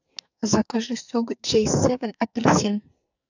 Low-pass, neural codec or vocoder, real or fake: 7.2 kHz; codec, 44.1 kHz, 2.6 kbps, SNAC; fake